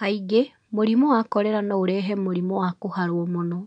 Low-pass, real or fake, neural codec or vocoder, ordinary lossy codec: 9.9 kHz; real; none; none